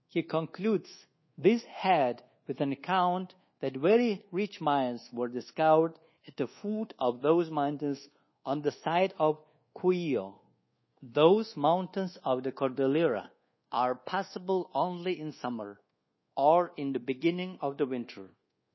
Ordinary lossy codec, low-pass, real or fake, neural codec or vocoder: MP3, 24 kbps; 7.2 kHz; fake; codec, 24 kHz, 1.2 kbps, DualCodec